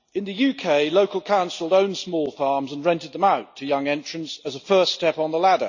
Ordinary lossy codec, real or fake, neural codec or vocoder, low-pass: none; real; none; 7.2 kHz